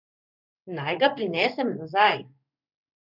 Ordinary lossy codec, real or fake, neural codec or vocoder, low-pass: none; fake; codec, 16 kHz in and 24 kHz out, 1 kbps, XY-Tokenizer; 5.4 kHz